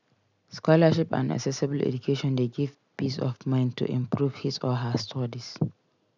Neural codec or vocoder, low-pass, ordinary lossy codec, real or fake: none; 7.2 kHz; none; real